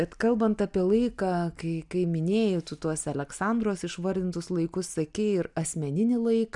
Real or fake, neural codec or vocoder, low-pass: real; none; 10.8 kHz